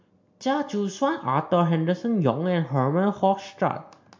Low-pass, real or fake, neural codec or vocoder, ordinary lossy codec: 7.2 kHz; real; none; MP3, 48 kbps